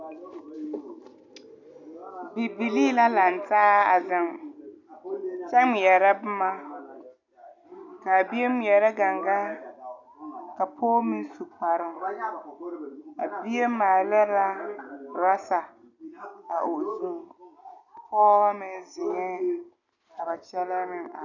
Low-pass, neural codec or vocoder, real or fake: 7.2 kHz; none; real